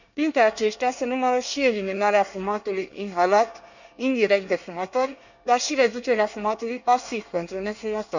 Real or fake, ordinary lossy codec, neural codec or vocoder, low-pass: fake; none; codec, 24 kHz, 1 kbps, SNAC; 7.2 kHz